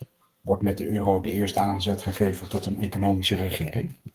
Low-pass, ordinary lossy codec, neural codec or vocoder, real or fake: 14.4 kHz; Opus, 24 kbps; codec, 32 kHz, 1.9 kbps, SNAC; fake